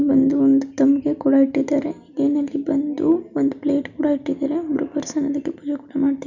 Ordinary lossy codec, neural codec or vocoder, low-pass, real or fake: none; none; 7.2 kHz; real